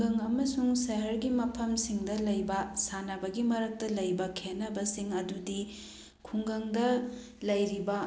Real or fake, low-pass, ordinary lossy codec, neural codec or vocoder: real; none; none; none